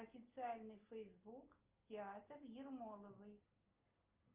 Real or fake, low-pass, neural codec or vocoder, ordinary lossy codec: real; 3.6 kHz; none; Opus, 24 kbps